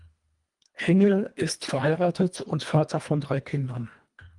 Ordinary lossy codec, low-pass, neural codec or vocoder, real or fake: Opus, 32 kbps; 10.8 kHz; codec, 24 kHz, 1.5 kbps, HILCodec; fake